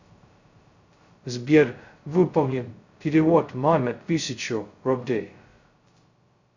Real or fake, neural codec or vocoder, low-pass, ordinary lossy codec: fake; codec, 16 kHz, 0.2 kbps, FocalCodec; 7.2 kHz; Opus, 64 kbps